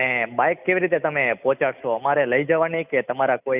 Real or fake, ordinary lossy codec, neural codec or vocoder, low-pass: real; none; none; 3.6 kHz